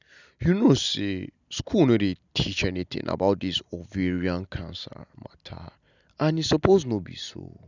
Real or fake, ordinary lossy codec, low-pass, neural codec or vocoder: real; none; 7.2 kHz; none